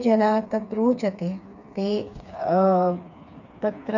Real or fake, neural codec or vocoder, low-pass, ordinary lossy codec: fake; codec, 16 kHz, 4 kbps, FreqCodec, smaller model; 7.2 kHz; none